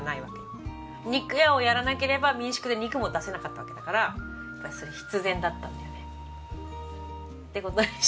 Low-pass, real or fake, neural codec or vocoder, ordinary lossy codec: none; real; none; none